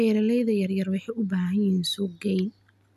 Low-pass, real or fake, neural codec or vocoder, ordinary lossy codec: 14.4 kHz; real; none; none